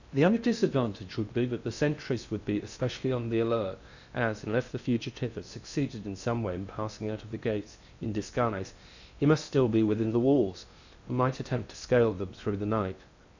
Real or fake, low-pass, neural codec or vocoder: fake; 7.2 kHz; codec, 16 kHz in and 24 kHz out, 0.6 kbps, FocalCodec, streaming, 2048 codes